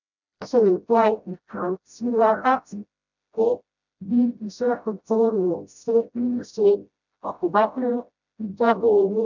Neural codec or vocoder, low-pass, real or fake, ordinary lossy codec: codec, 16 kHz, 0.5 kbps, FreqCodec, smaller model; 7.2 kHz; fake; none